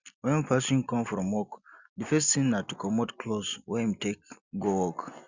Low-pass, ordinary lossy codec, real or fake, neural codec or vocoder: 7.2 kHz; Opus, 64 kbps; real; none